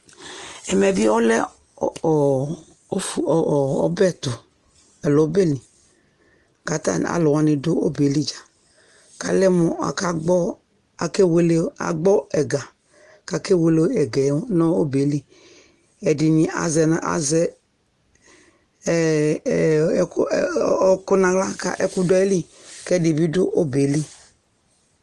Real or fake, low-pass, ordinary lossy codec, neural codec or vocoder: real; 10.8 kHz; Opus, 24 kbps; none